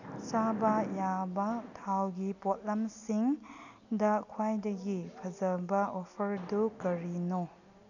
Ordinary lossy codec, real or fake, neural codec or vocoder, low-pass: none; real; none; 7.2 kHz